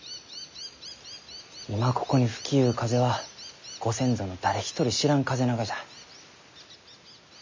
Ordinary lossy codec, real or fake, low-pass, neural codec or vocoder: MP3, 48 kbps; real; 7.2 kHz; none